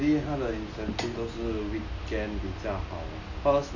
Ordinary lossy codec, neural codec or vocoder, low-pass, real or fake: none; none; 7.2 kHz; real